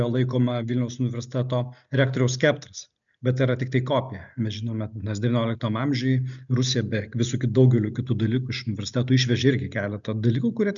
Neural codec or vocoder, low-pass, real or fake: none; 7.2 kHz; real